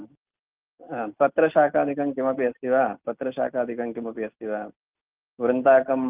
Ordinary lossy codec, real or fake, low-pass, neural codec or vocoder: Opus, 32 kbps; real; 3.6 kHz; none